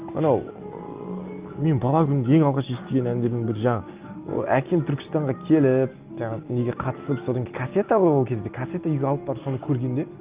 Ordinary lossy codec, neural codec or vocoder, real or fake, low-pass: Opus, 32 kbps; none; real; 3.6 kHz